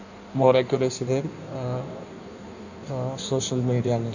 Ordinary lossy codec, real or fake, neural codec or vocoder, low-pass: none; fake; codec, 16 kHz in and 24 kHz out, 1.1 kbps, FireRedTTS-2 codec; 7.2 kHz